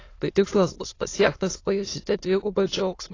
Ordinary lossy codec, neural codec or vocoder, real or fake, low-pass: AAC, 32 kbps; autoencoder, 22.05 kHz, a latent of 192 numbers a frame, VITS, trained on many speakers; fake; 7.2 kHz